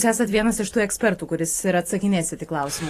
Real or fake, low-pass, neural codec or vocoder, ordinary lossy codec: fake; 14.4 kHz; vocoder, 44.1 kHz, 128 mel bands every 512 samples, BigVGAN v2; AAC, 48 kbps